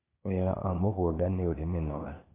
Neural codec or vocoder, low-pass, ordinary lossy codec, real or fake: codec, 16 kHz, 0.8 kbps, ZipCodec; 3.6 kHz; AAC, 16 kbps; fake